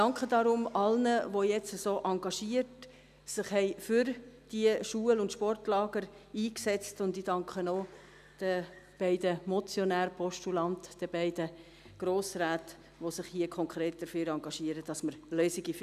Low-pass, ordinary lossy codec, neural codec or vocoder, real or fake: 14.4 kHz; none; none; real